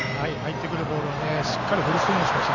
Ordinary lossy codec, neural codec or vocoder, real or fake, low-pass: none; none; real; 7.2 kHz